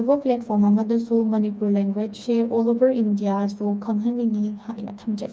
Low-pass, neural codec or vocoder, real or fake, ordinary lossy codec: none; codec, 16 kHz, 2 kbps, FreqCodec, smaller model; fake; none